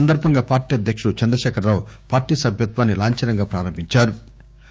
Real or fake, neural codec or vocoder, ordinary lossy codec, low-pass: fake; codec, 16 kHz, 6 kbps, DAC; none; none